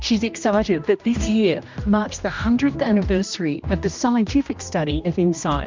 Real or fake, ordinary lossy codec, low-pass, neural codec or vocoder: fake; MP3, 64 kbps; 7.2 kHz; codec, 16 kHz, 1 kbps, X-Codec, HuBERT features, trained on general audio